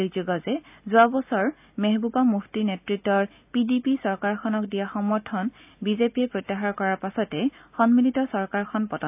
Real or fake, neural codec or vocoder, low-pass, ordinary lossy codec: real; none; 3.6 kHz; AAC, 32 kbps